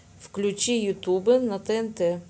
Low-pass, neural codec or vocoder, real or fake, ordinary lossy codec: none; none; real; none